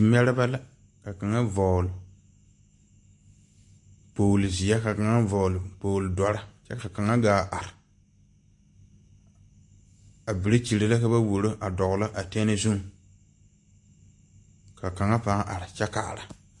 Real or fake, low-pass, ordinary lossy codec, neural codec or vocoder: real; 10.8 kHz; MP3, 48 kbps; none